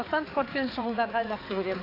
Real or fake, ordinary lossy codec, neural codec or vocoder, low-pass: fake; AAC, 24 kbps; codec, 16 kHz, 4 kbps, X-Codec, HuBERT features, trained on balanced general audio; 5.4 kHz